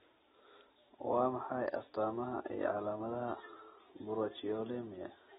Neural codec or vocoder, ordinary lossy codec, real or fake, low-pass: none; AAC, 16 kbps; real; 19.8 kHz